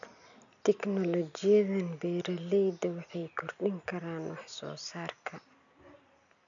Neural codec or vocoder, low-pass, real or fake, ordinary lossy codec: none; 7.2 kHz; real; none